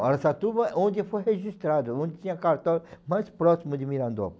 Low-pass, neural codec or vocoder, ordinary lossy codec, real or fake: none; none; none; real